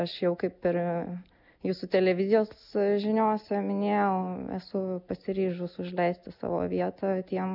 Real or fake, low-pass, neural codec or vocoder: real; 5.4 kHz; none